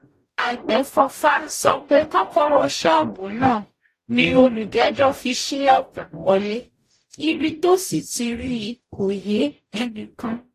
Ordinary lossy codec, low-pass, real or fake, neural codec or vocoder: AAC, 48 kbps; 14.4 kHz; fake; codec, 44.1 kHz, 0.9 kbps, DAC